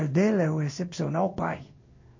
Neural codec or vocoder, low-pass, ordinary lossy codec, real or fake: codec, 16 kHz in and 24 kHz out, 1 kbps, XY-Tokenizer; 7.2 kHz; MP3, 32 kbps; fake